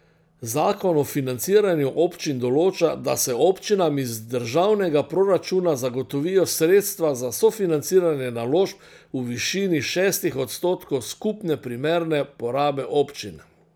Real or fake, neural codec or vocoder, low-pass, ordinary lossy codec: real; none; none; none